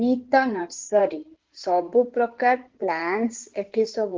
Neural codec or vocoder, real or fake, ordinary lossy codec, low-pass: codec, 16 kHz in and 24 kHz out, 2.2 kbps, FireRedTTS-2 codec; fake; Opus, 16 kbps; 7.2 kHz